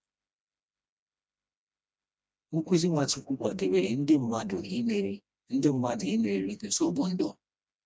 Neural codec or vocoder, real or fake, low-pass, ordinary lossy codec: codec, 16 kHz, 1 kbps, FreqCodec, smaller model; fake; none; none